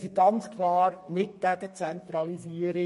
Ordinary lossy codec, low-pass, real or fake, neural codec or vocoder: MP3, 48 kbps; 14.4 kHz; fake; codec, 32 kHz, 1.9 kbps, SNAC